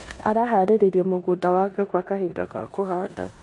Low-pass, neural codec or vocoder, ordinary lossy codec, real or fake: 10.8 kHz; codec, 16 kHz in and 24 kHz out, 0.9 kbps, LongCat-Audio-Codec, four codebook decoder; MP3, 48 kbps; fake